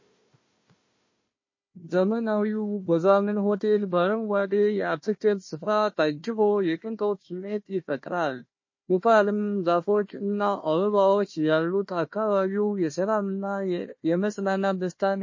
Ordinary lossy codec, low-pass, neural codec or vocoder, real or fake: MP3, 32 kbps; 7.2 kHz; codec, 16 kHz, 1 kbps, FunCodec, trained on Chinese and English, 50 frames a second; fake